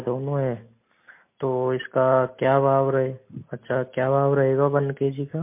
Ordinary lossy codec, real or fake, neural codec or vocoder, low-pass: MP3, 24 kbps; real; none; 3.6 kHz